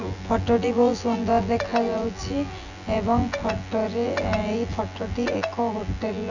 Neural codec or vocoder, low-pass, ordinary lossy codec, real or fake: vocoder, 24 kHz, 100 mel bands, Vocos; 7.2 kHz; none; fake